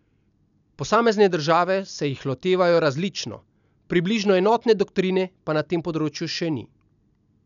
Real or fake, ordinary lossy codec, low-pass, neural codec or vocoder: real; none; 7.2 kHz; none